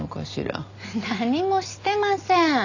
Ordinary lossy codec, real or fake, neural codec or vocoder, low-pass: none; real; none; 7.2 kHz